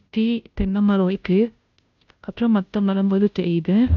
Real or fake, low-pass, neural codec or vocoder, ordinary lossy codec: fake; 7.2 kHz; codec, 16 kHz, 0.5 kbps, FunCodec, trained on Chinese and English, 25 frames a second; none